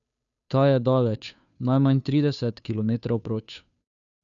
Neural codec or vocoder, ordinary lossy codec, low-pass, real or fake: codec, 16 kHz, 2 kbps, FunCodec, trained on Chinese and English, 25 frames a second; none; 7.2 kHz; fake